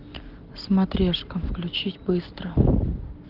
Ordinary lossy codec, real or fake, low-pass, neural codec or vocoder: Opus, 16 kbps; real; 5.4 kHz; none